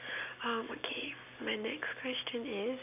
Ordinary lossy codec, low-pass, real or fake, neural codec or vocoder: none; 3.6 kHz; real; none